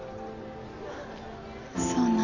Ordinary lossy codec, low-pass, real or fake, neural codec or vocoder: none; 7.2 kHz; real; none